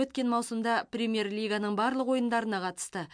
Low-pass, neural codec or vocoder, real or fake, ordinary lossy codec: 9.9 kHz; none; real; MP3, 64 kbps